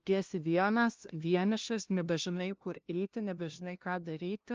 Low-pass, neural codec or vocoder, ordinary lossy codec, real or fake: 7.2 kHz; codec, 16 kHz, 0.5 kbps, FunCodec, trained on LibriTTS, 25 frames a second; Opus, 24 kbps; fake